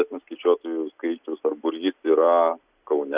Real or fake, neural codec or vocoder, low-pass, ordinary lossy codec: real; none; 3.6 kHz; Opus, 64 kbps